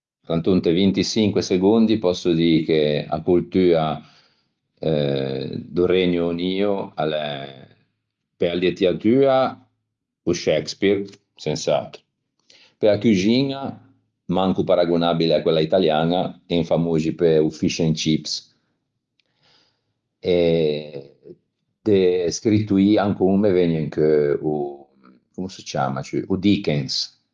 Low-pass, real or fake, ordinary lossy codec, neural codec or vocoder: 7.2 kHz; real; Opus, 32 kbps; none